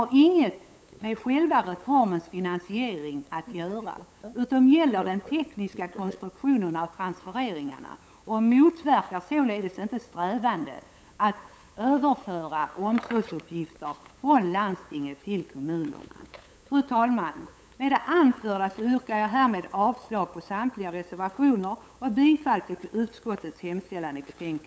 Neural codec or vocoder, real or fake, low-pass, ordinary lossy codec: codec, 16 kHz, 8 kbps, FunCodec, trained on LibriTTS, 25 frames a second; fake; none; none